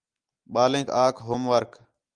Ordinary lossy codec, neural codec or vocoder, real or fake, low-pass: Opus, 32 kbps; none; real; 9.9 kHz